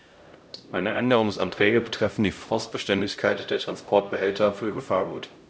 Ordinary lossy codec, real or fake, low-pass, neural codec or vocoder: none; fake; none; codec, 16 kHz, 0.5 kbps, X-Codec, HuBERT features, trained on LibriSpeech